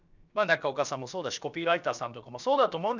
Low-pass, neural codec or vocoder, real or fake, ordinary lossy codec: 7.2 kHz; codec, 16 kHz, about 1 kbps, DyCAST, with the encoder's durations; fake; none